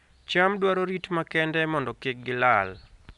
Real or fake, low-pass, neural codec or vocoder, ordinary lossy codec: real; 10.8 kHz; none; none